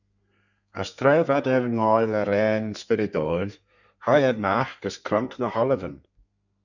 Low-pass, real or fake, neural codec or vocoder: 7.2 kHz; fake; codec, 32 kHz, 1.9 kbps, SNAC